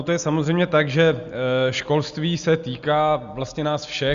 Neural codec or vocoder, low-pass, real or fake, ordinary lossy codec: none; 7.2 kHz; real; Opus, 64 kbps